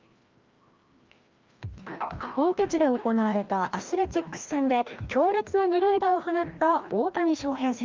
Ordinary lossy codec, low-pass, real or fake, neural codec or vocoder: Opus, 24 kbps; 7.2 kHz; fake; codec, 16 kHz, 1 kbps, FreqCodec, larger model